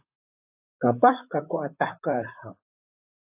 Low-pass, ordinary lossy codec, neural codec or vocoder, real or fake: 3.6 kHz; AAC, 32 kbps; vocoder, 44.1 kHz, 128 mel bands, Pupu-Vocoder; fake